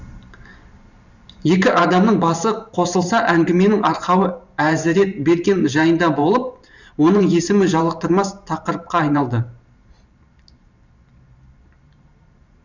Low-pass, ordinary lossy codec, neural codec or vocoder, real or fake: 7.2 kHz; none; vocoder, 44.1 kHz, 128 mel bands every 512 samples, BigVGAN v2; fake